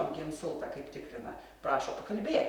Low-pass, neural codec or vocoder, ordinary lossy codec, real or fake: 19.8 kHz; vocoder, 44.1 kHz, 128 mel bands, Pupu-Vocoder; Opus, 64 kbps; fake